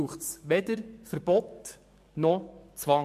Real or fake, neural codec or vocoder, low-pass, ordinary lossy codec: fake; codec, 44.1 kHz, 7.8 kbps, DAC; 14.4 kHz; MP3, 64 kbps